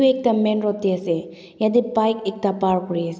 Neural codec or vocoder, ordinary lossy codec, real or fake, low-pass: none; none; real; none